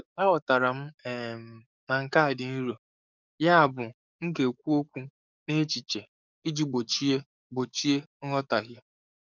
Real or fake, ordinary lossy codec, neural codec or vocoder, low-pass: fake; none; codec, 44.1 kHz, 7.8 kbps, DAC; 7.2 kHz